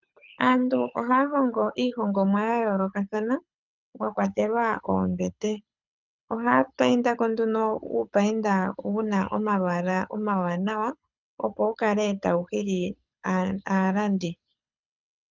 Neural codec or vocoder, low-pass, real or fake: codec, 24 kHz, 6 kbps, HILCodec; 7.2 kHz; fake